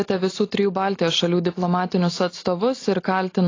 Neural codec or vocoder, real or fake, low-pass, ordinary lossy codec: none; real; 7.2 kHz; AAC, 32 kbps